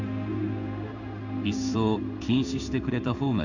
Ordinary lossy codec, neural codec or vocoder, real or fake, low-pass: none; codec, 16 kHz in and 24 kHz out, 1 kbps, XY-Tokenizer; fake; 7.2 kHz